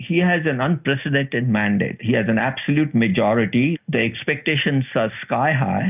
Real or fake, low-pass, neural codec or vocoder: real; 3.6 kHz; none